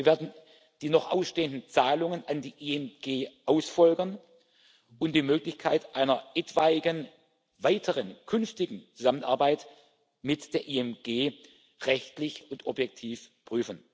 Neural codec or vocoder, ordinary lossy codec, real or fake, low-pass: none; none; real; none